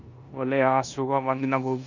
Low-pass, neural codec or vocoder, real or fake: 7.2 kHz; codec, 16 kHz in and 24 kHz out, 0.9 kbps, LongCat-Audio-Codec, four codebook decoder; fake